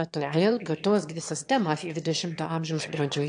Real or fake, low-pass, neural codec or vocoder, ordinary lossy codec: fake; 9.9 kHz; autoencoder, 22.05 kHz, a latent of 192 numbers a frame, VITS, trained on one speaker; AAC, 64 kbps